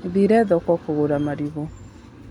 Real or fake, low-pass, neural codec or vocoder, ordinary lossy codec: real; 19.8 kHz; none; none